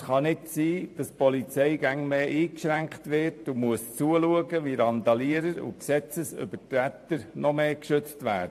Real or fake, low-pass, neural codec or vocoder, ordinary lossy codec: fake; 14.4 kHz; vocoder, 44.1 kHz, 128 mel bands every 512 samples, BigVGAN v2; AAC, 64 kbps